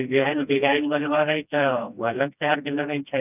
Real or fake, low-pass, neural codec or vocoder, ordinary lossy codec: fake; 3.6 kHz; codec, 16 kHz, 1 kbps, FreqCodec, smaller model; none